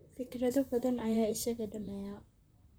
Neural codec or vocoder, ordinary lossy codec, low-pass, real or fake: vocoder, 44.1 kHz, 128 mel bands, Pupu-Vocoder; none; none; fake